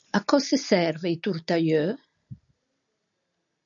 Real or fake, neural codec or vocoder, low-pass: real; none; 7.2 kHz